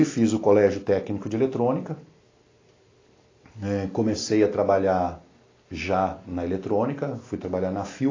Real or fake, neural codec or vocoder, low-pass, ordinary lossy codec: real; none; 7.2 kHz; AAC, 32 kbps